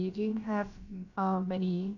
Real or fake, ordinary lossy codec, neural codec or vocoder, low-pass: fake; none; codec, 16 kHz, about 1 kbps, DyCAST, with the encoder's durations; 7.2 kHz